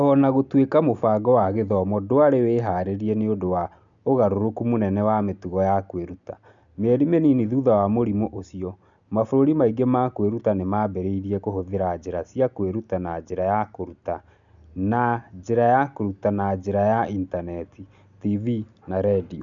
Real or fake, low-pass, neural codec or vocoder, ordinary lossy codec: real; 7.2 kHz; none; none